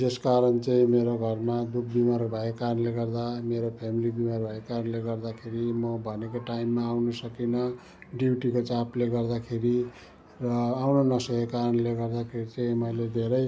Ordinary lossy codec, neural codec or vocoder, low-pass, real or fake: none; none; none; real